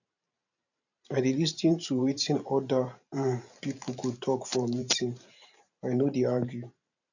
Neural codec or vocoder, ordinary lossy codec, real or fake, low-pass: vocoder, 44.1 kHz, 128 mel bands every 512 samples, BigVGAN v2; none; fake; 7.2 kHz